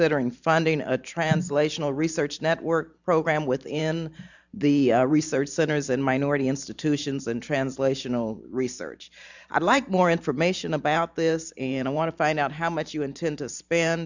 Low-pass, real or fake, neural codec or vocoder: 7.2 kHz; fake; vocoder, 44.1 kHz, 128 mel bands every 512 samples, BigVGAN v2